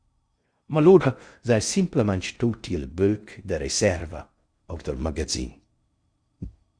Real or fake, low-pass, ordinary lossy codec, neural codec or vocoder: fake; 9.9 kHz; Opus, 64 kbps; codec, 16 kHz in and 24 kHz out, 0.6 kbps, FocalCodec, streaming, 4096 codes